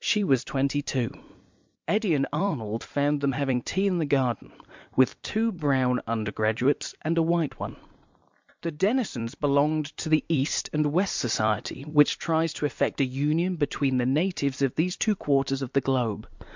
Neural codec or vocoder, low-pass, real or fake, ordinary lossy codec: vocoder, 44.1 kHz, 128 mel bands every 256 samples, BigVGAN v2; 7.2 kHz; fake; MP3, 64 kbps